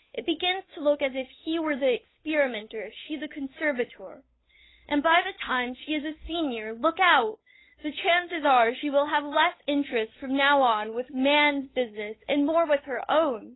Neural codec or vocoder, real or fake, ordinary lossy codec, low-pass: codec, 16 kHz, 8 kbps, FunCodec, trained on Chinese and English, 25 frames a second; fake; AAC, 16 kbps; 7.2 kHz